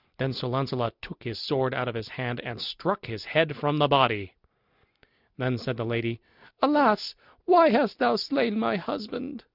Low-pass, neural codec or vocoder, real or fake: 5.4 kHz; none; real